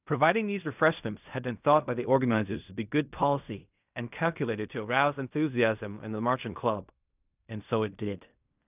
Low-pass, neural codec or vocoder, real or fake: 3.6 kHz; codec, 16 kHz in and 24 kHz out, 0.4 kbps, LongCat-Audio-Codec, fine tuned four codebook decoder; fake